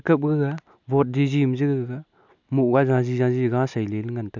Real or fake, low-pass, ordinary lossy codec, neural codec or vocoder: real; 7.2 kHz; none; none